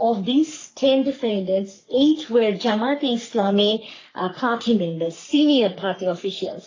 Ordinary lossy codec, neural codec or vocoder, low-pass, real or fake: AAC, 32 kbps; codec, 44.1 kHz, 3.4 kbps, Pupu-Codec; 7.2 kHz; fake